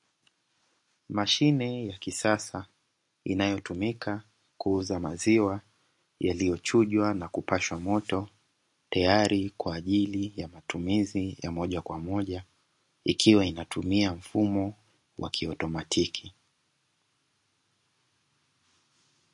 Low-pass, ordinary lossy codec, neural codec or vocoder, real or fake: 10.8 kHz; MP3, 48 kbps; none; real